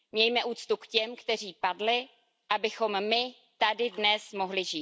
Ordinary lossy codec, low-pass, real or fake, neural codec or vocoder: none; none; real; none